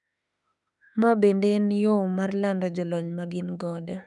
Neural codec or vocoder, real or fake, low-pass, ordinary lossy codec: autoencoder, 48 kHz, 32 numbers a frame, DAC-VAE, trained on Japanese speech; fake; 10.8 kHz; none